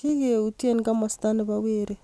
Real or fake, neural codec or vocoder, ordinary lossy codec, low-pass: real; none; none; none